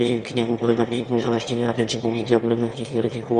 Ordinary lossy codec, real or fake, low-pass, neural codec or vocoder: Opus, 32 kbps; fake; 9.9 kHz; autoencoder, 22.05 kHz, a latent of 192 numbers a frame, VITS, trained on one speaker